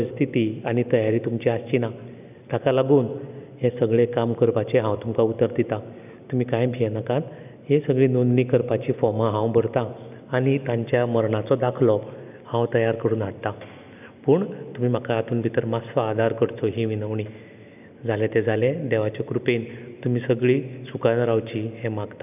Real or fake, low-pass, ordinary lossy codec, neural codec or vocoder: real; 3.6 kHz; none; none